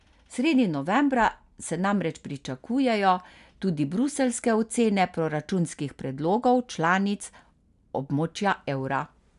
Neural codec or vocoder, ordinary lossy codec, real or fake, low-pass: none; none; real; 10.8 kHz